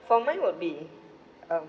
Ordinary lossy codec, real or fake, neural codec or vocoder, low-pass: none; real; none; none